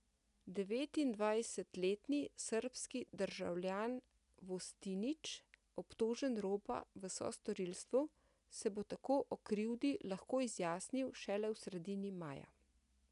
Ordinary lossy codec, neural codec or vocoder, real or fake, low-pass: none; none; real; 10.8 kHz